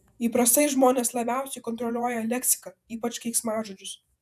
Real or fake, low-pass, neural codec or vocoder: fake; 14.4 kHz; vocoder, 48 kHz, 128 mel bands, Vocos